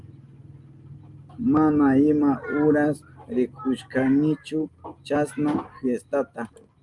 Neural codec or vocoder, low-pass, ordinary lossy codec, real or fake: none; 10.8 kHz; Opus, 32 kbps; real